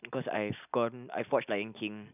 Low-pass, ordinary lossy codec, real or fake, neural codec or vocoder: 3.6 kHz; none; real; none